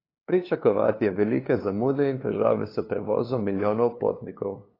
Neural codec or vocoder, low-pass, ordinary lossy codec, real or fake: codec, 16 kHz, 2 kbps, FunCodec, trained on LibriTTS, 25 frames a second; 5.4 kHz; AAC, 24 kbps; fake